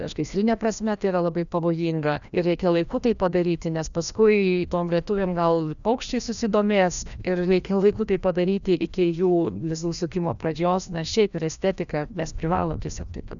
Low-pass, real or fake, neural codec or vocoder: 7.2 kHz; fake; codec, 16 kHz, 1 kbps, FreqCodec, larger model